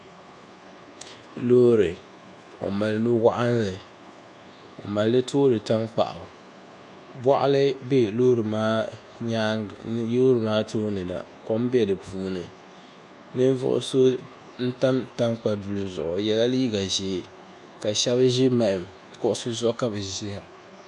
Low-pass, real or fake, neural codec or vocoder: 10.8 kHz; fake; codec, 24 kHz, 1.2 kbps, DualCodec